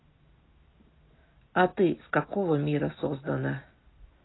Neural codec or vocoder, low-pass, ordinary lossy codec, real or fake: none; 7.2 kHz; AAC, 16 kbps; real